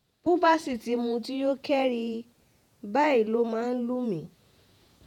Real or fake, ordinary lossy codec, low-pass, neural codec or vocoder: fake; none; 19.8 kHz; vocoder, 48 kHz, 128 mel bands, Vocos